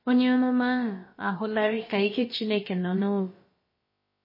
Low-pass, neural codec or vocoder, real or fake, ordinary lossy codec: 5.4 kHz; codec, 16 kHz, about 1 kbps, DyCAST, with the encoder's durations; fake; MP3, 24 kbps